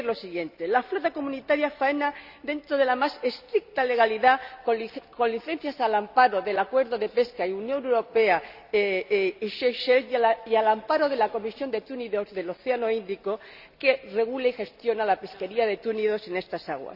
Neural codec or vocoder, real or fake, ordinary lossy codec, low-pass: none; real; none; 5.4 kHz